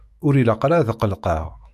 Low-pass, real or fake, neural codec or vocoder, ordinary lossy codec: 14.4 kHz; fake; autoencoder, 48 kHz, 128 numbers a frame, DAC-VAE, trained on Japanese speech; MP3, 96 kbps